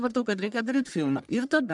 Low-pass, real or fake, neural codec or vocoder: 10.8 kHz; fake; codec, 44.1 kHz, 1.7 kbps, Pupu-Codec